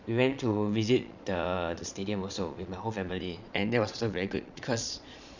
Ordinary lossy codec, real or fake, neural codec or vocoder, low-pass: none; fake; vocoder, 22.05 kHz, 80 mel bands, Vocos; 7.2 kHz